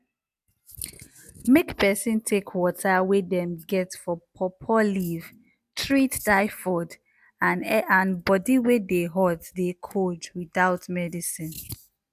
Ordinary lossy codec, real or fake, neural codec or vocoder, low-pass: none; real; none; 14.4 kHz